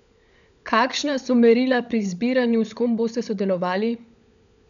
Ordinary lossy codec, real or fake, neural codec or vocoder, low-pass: none; fake; codec, 16 kHz, 16 kbps, FunCodec, trained on LibriTTS, 50 frames a second; 7.2 kHz